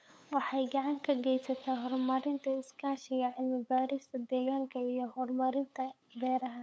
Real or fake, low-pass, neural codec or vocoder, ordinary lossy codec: fake; none; codec, 16 kHz, 8 kbps, FunCodec, trained on LibriTTS, 25 frames a second; none